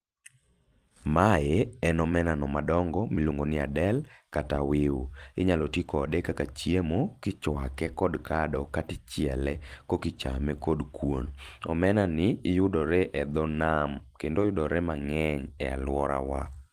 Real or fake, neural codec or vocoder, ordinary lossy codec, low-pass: fake; vocoder, 44.1 kHz, 128 mel bands every 256 samples, BigVGAN v2; Opus, 24 kbps; 14.4 kHz